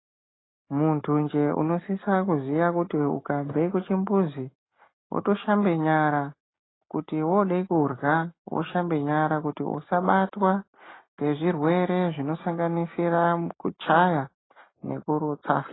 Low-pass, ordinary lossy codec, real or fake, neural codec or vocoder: 7.2 kHz; AAC, 16 kbps; real; none